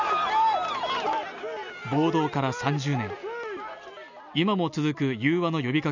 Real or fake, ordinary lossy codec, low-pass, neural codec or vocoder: real; none; 7.2 kHz; none